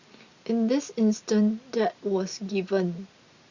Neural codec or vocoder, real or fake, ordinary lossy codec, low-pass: none; real; Opus, 64 kbps; 7.2 kHz